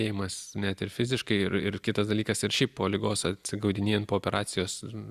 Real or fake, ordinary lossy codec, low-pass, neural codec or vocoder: real; Opus, 64 kbps; 14.4 kHz; none